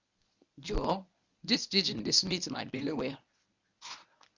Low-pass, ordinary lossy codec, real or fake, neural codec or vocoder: 7.2 kHz; Opus, 64 kbps; fake; codec, 24 kHz, 0.9 kbps, WavTokenizer, medium speech release version 1